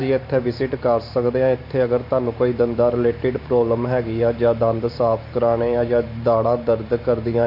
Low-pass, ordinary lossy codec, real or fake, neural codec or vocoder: 5.4 kHz; MP3, 32 kbps; fake; vocoder, 44.1 kHz, 128 mel bands every 512 samples, BigVGAN v2